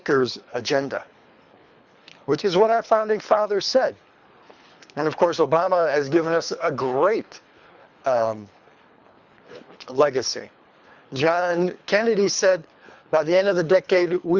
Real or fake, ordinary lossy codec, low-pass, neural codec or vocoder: fake; Opus, 64 kbps; 7.2 kHz; codec, 24 kHz, 3 kbps, HILCodec